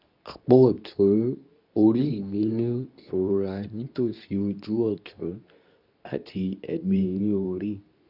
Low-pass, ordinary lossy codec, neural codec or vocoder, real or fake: 5.4 kHz; none; codec, 24 kHz, 0.9 kbps, WavTokenizer, medium speech release version 2; fake